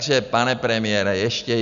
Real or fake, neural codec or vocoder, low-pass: real; none; 7.2 kHz